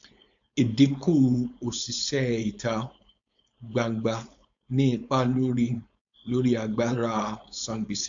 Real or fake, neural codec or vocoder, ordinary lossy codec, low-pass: fake; codec, 16 kHz, 4.8 kbps, FACodec; AAC, 64 kbps; 7.2 kHz